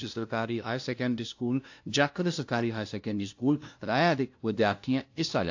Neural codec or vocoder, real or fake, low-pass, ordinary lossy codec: codec, 16 kHz, 0.5 kbps, FunCodec, trained on LibriTTS, 25 frames a second; fake; 7.2 kHz; AAC, 48 kbps